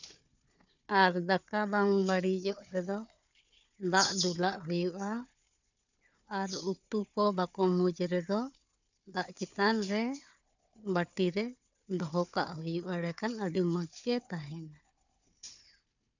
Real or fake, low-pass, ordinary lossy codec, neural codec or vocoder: fake; 7.2 kHz; none; codec, 16 kHz, 4 kbps, FunCodec, trained on Chinese and English, 50 frames a second